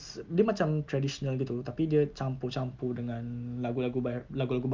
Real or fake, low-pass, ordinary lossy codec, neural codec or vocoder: real; 7.2 kHz; Opus, 24 kbps; none